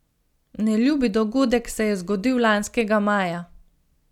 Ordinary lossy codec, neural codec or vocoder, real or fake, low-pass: none; none; real; 19.8 kHz